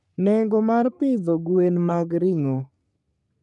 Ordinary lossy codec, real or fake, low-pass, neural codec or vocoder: none; fake; 10.8 kHz; codec, 44.1 kHz, 3.4 kbps, Pupu-Codec